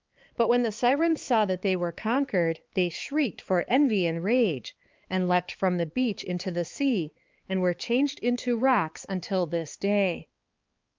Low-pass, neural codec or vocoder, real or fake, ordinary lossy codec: 7.2 kHz; codec, 16 kHz, 4 kbps, X-Codec, HuBERT features, trained on balanced general audio; fake; Opus, 32 kbps